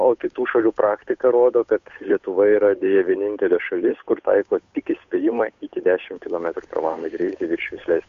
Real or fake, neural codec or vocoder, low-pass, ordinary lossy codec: fake; codec, 16 kHz, 8 kbps, FunCodec, trained on Chinese and English, 25 frames a second; 7.2 kHz; MP3, 48 kbps